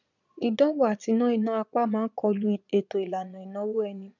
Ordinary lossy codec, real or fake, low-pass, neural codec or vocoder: none; fake; 7.2 kHz; vocoder, 22.05 kHz, 80 mel bands, Vocos